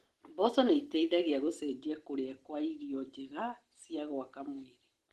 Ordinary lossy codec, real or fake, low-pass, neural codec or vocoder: Opus, 16 kbps; real; 14.4 kHz; none